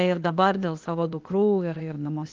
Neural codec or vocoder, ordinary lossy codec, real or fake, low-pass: codec, 16 kHz, 0.8 kbps, ZipCodec; Opus, 24 kbps; fake; 7.2 kHz